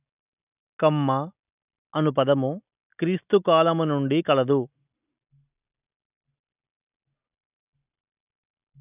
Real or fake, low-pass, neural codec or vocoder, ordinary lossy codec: real; 3.6 kHz; none; none